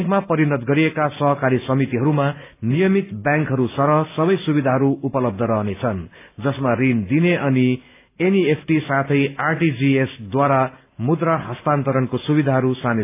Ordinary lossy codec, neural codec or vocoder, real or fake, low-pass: AAC, 24 kbps; none; real; 3.6 kHz